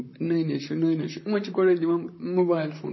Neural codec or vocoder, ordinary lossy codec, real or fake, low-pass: vocoder, 44.1 kHz, 128 mel bands, Pupu-Vocoder; MP3, 24 kbps; fake; 7.2 kHz